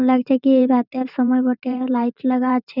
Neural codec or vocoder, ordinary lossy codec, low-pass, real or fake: vocoder, 44.1 kHz, 128 mel bands every 512 samples, BigVGAN v2; none; 5.4 kHz; fake